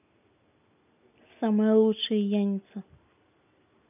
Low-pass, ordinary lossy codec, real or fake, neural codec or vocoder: 3.6 kHz; none; real; none